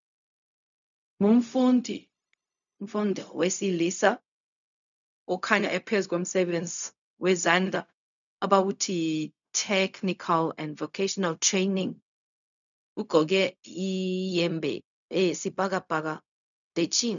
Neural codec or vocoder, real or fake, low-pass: codec, 16 kHz, 0.4 kbps, LongCat-Audio-Codec; fake; 7.2 kHz